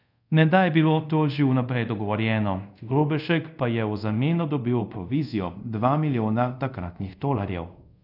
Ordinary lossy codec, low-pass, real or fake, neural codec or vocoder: none; 5.4 kHz; fake; codec, 24 kHz, 0.5 kbps, DualCodec